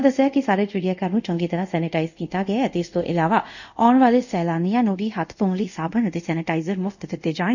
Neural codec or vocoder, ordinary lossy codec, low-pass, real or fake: codec, 24 kHz, 0.5 kbps, DualCodec; none; 7.2 kHz; fake